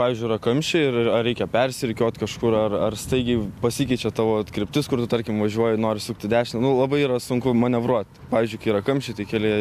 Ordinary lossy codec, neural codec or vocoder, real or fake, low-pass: MP3, 96 kbps; none; real; 14.4 kHz